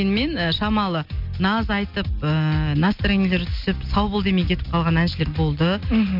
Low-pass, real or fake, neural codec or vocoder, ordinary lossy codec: 5.4 kHz; real; none; MP3, 48 kbps